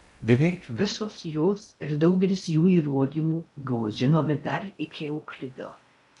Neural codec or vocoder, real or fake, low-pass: codec, 16 kHz in and 24 kHz out, 0.6 kbps, FocalCodec, streaming, 2048 codes; fake; 10.8 kHz